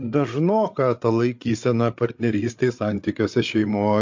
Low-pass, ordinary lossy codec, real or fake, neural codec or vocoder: 7.2 kHz; MP3, 48 kbps; fake; codec, 16 kHz, 8 kbps, FreqCodec, larger model